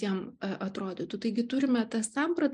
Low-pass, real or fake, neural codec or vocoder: 10.8 kHz; real; none